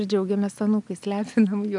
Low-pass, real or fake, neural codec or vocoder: 10.8 kHz; real; none